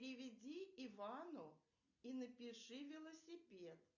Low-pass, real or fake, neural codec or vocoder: 7.2 kHz; real; none